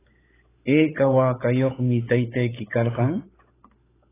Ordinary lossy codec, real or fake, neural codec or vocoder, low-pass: AAC, 16 kbps; fake; codec, 16 kHz, 16 kbps, FunCodec, trained on LibriTTS, 50 frames a second; 3.6 kHz